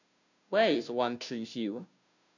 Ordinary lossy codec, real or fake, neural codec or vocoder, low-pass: none; fake; codec, 16 kHz, 0.5 kbps, FunCodec, trained on Chinese and English, 25 frames a second; 7.2 kHz